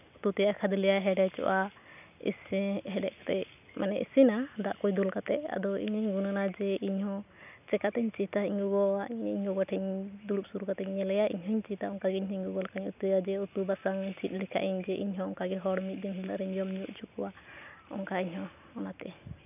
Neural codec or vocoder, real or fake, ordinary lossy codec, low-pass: none; real; none; 3.6 kHz